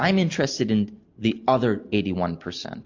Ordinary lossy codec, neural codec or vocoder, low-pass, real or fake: MP3, 48 kbps; none; 7.2 kHz; real